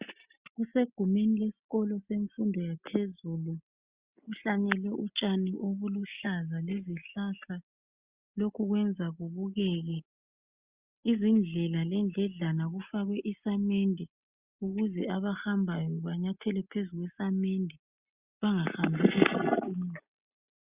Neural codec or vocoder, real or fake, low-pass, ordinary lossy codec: none; real; 3.6 kHz; Opus, 64 kbps